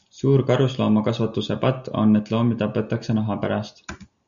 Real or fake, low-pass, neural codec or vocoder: real; 7.2 kHz; none